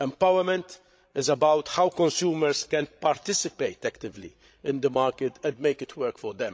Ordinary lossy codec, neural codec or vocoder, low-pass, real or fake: none; codec, 16 kHz, 16 kbps, FreqCodec, larger model; none; fake